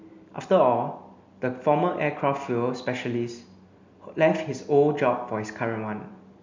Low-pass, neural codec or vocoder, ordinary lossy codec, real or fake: 7.2 kHz; none; MP3, 64 kbps; real